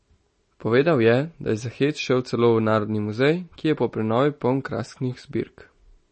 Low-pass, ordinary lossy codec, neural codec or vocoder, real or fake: 10.8 kHz; MP3, 32 kbps; none; real